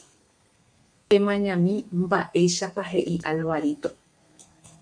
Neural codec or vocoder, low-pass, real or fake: codec, 32 kHz, 1.9 kbps, SNAC; 9.9 kHz; fake